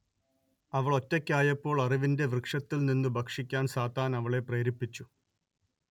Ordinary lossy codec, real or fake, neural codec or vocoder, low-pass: none; real; none; 19.8 kHz